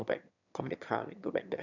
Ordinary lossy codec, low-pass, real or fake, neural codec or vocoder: none; 7.2 kHz; fake; autoencoder, 22.05 kHz, a latent of 192 numbers a frame, VITS, trained on one speaker